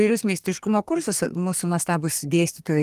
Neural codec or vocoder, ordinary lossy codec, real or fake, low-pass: codec, 32 kHz, 1.9 kbps, SNAC; Opus, 24 kbps; fake; 14.4 kHz